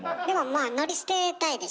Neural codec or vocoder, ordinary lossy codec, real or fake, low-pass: none; none; real; none